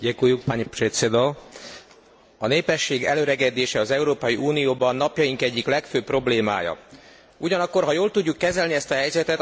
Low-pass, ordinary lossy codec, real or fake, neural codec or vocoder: none; none; real; none